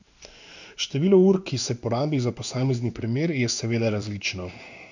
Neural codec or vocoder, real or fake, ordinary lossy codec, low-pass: codec, 16 kHz in and 24 kHz out, 1 kbps, XY-Tokenizer; fake; none; 7.2 kHz